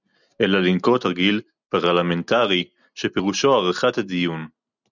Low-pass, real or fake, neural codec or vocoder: 7.2 kHz; real; none